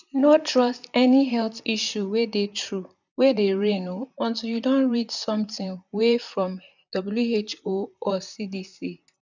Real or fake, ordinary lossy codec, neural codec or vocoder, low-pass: fake; none; vocoder, 44.1 kHz, 128 mel bands, Pupu-Vocoder; 7.2 kHz